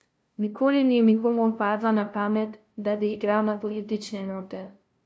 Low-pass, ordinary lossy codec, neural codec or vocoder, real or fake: none; none; codec, 16 kHz, 0.5 kbps, FunCodec, trained on LibriTTS, 25 frames a second; fake